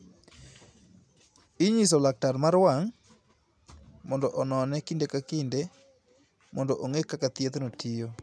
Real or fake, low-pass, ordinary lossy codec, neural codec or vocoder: real; none; none; none